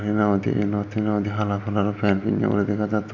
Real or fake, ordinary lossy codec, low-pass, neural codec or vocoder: real; MP3, 48 kbps; 7.2 kHz; none